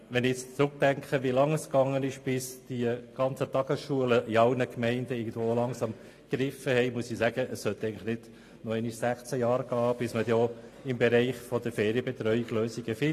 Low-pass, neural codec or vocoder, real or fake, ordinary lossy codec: 14.4 kHz; none; real; AAC, 48 kbps